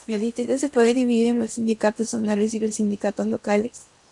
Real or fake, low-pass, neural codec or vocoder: fake; 10.8 kHz; codec, 16 kHz in and 24 kHz out, 0.8 kbps, FocalCodec, streaming, 65536 codes